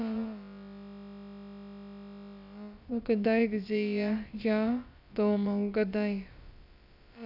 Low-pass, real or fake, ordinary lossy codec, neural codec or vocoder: 5.4 kHz; fake; none; codec, 16 kHz, about 1 kbps, DyCAST, with the encoder's durations